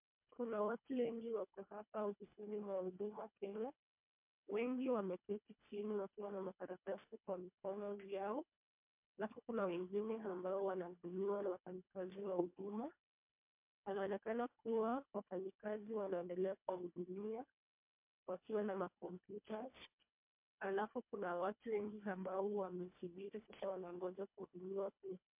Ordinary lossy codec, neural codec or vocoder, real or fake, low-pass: MP3, 32 kbps; codec, 24 kHz, 1.5 kbps, HILCodec; fake; 3.6 kHz